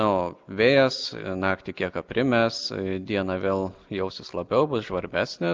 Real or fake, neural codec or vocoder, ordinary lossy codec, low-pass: real; none; Opus, 24 kbps; 7.2 kHz